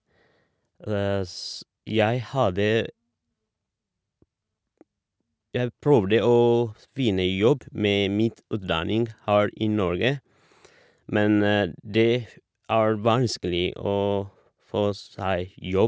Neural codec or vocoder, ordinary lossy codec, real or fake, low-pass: none; none; real; none